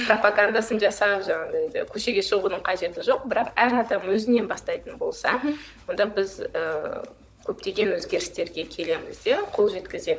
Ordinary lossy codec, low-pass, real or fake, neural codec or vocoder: none; none; fake; codec, 16 kHz, 16 kbps, FunCodec, trained on LibriTTS, 50 frames a second